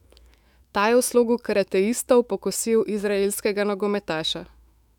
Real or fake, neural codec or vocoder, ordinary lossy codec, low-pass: fake; autoencoder, 48 kHz, 128 numbers a frame, DAC-VAE, trained on Japanese speech; none; 19.8 kHz